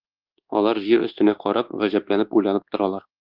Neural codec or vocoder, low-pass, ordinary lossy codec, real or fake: codec, 24 kHz, 1.2 kbps, DualCodec; 5.4 kHz; Opus, 16 kbps; fake